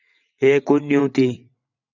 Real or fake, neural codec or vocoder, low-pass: fake; vocoder, 22.05 kHz, 80 mel bands, WaveNeXt; 7.2 kHz